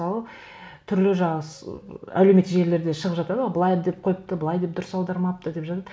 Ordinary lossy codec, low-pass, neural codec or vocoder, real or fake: none; none; none; real